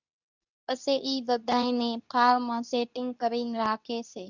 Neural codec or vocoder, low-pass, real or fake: codec, 24 kHz, 0.9 kbps, WavTokenizer, medium speech release version 2; 7.2 kHz; fake